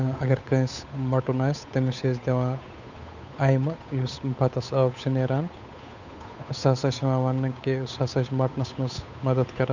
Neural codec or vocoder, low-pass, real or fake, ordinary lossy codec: codec, 16 kHz, 8 kbps, FunCodec, trained on Chinese and English, 25 frames a second; 7.2 kHz; fake; none